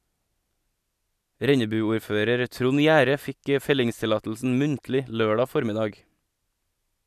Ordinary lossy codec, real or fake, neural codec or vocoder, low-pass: none; real; none; 14.4 kHz